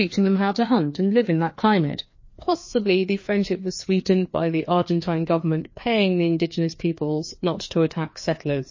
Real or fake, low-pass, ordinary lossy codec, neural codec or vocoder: fake; 7.2 kHz; MP3, 32 kbps; codec, 16 kHz, 2 kbps, FreqCodec, larger model